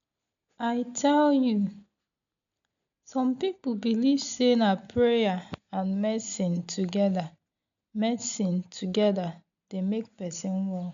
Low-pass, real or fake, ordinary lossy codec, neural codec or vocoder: 7.2 kHz; real; none; none